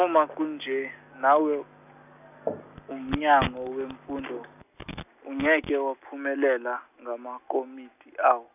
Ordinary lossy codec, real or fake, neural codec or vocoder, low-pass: none; real; none; 3.6 kHz